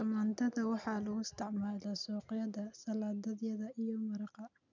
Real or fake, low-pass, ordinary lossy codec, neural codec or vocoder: real; 7.2 kHz; none; none